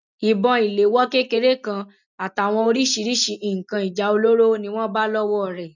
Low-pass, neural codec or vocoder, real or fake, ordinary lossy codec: 7.2 kHz; none; real; none